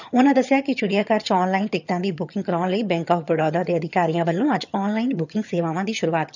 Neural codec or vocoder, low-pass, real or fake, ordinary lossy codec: vocoder, 22.05 kHz, 80 mel bands, HiFi-GAN; 7.2 kHz; fake; none